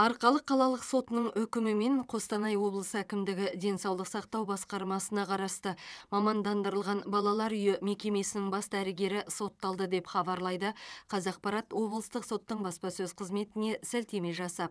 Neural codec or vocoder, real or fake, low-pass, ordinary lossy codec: vocoder, 22.05 kHz, 80 mel bands, WaveNeXt; fake; none; none